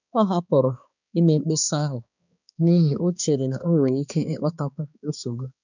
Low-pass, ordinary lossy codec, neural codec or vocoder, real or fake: 7.2 kHz; none; codec, 16 kHz, 2 kbps, X-Codec, HuBERT features, trained on balanced general audio; fake